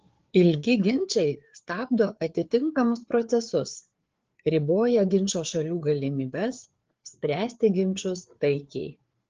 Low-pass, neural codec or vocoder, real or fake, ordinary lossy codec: 7.2 kHz; codec, 16 kHz, 4 kbps, FreqCodec, larger model; fake; Opus, 16 kbps